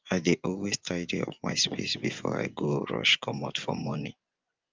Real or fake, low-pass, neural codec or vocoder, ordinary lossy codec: fake; 7.2 kHz; vocoder, 24 kHz, 100 mel bands, Vocos; Opus, 24 kbps